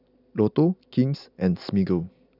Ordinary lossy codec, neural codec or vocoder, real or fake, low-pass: none; none; real; 5.4 kHz